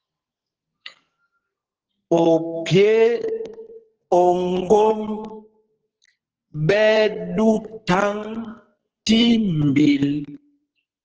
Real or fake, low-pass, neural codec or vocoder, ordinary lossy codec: fake; 7.2 kHz; codec, 44.1 kHz, 2.6 kbps, SNAC; Opus, 16 kbps